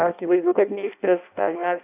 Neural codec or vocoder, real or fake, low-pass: codec, 16 kHz in and 24 kHz out, 0.6 kbps, FireRedTTS-2 codec; fake; 3.6 kHz